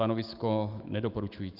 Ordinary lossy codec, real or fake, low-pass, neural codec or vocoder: Opus, 32 kbps; fake; 5.4 kHz; autoencoder, 48 kHz, 128 numbers a frame, DAC-VAE, trained on Japanese speech